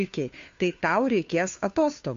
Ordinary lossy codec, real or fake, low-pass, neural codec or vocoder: AAC, 64 kbps; fake; 7.2 kHz; codec, 16 kHz, 2 kbps, FunCodec, trained on Chinese and English, 25 frames a second